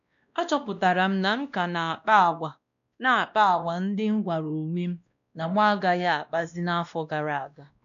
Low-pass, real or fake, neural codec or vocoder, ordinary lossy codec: 7.2 kHz; fake; codec, 16 kHz, 1 kbps, X-Codec, WavLM features, trained on Multilingual LibriSpeech; none